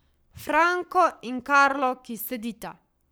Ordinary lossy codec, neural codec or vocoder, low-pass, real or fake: none; codec, 44.1 kHz, 7.8 kbps, Pupu-Codec; none; fake